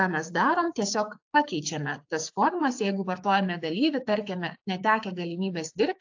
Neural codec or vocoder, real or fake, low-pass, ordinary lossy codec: codec, 16 kHz, 6 kbps, DAC; fake; 7.2 kHz; AAC, 48 kbps